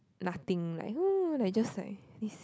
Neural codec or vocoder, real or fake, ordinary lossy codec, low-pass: none; real; none; none